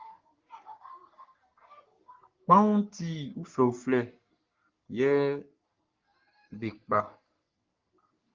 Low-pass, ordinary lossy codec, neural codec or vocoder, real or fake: 7.2 kHz; Opus, 24 kbps; codec, 44.1 kHz, 3.4 kbps, Pupu-Codec; fake